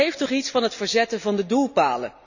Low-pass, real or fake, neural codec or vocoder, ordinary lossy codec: 7.2 kHz; real; none; none